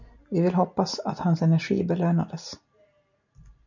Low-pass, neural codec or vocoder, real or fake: 7.2 kHz; none; real